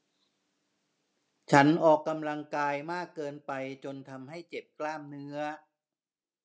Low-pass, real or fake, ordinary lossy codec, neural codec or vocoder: none; real; none; none